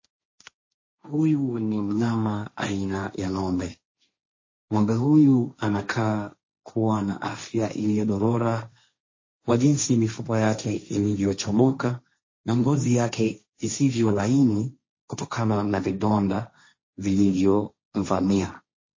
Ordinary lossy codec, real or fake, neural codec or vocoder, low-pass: MP3, 32 kbps; fake; codec, 16 kHz, 1.1 kbps, Voila-Tokenizer; 7.2 kHz